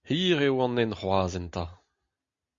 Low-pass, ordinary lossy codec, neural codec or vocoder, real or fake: 7.2 kHz; Opus, 64 kbps; none; real